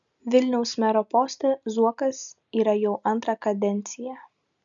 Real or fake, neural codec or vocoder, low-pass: real; none; 7.2 kHz